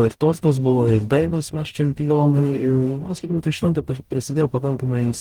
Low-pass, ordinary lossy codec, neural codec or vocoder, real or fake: 19.8 kHz; Opus, 24 kbps; codec, 44.1 kHz, 0.9 kbps, DAC; fake